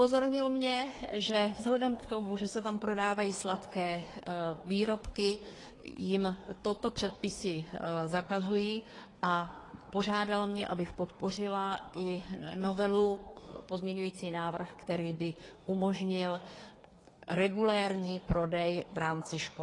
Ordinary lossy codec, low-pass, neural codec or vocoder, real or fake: AAC, 32 kbps; 10.8 kHz; codec, 24 kHz, 1 kbps, SNAC; fake